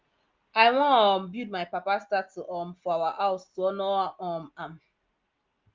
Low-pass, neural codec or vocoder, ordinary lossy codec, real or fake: 7.2 kHz; none; Opus, 32 kbps; real